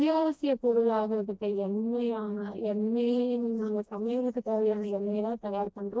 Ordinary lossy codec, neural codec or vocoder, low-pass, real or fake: none; codec, 16 kHz, 1 kbps, FreqCodec, smaller model; none; fake